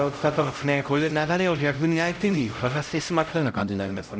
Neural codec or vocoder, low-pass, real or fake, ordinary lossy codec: codec, 16 kHz, 0.5 kbps, X-Codec, HuBERT features, trained on LibriSpeech; none; fake; none